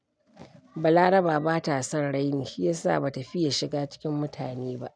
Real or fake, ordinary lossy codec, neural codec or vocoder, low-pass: real; none; none; 9.9 kHz